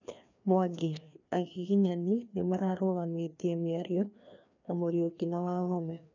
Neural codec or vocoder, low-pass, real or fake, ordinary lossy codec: codec, 16 kHz, 2 kbps, FreqCodec, larger model; 7.2 kHz; fake; none